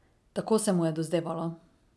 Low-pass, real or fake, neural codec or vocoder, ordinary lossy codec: none; real; none; none